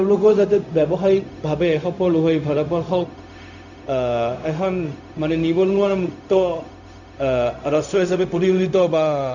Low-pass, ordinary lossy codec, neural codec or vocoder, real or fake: 7.2 kHz; none; codec, 16 kHz, 0.4 kbps, LongCat-Audio-Codec; fake